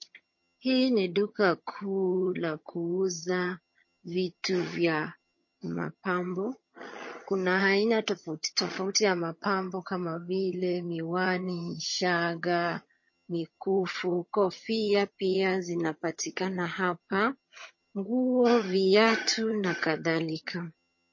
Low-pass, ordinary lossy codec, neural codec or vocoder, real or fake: 7.2 kHz; MP3, 32 kbps; vocoder, 22.05 kHz, 80 mel bands, HiFi-GAN; fake